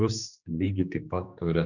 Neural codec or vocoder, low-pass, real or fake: codec, 16 kHz, 2 kbps, X-Codec, HuBERT features, trained on general audio; 7.2 kHz; fake